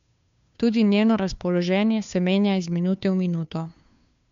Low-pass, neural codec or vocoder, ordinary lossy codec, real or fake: 7.2 kHz; codec, 16 kHz, 2 kbps, FunCodec, trained on Chinese and English, 25 frames a second; MP3, 64 kbps; fake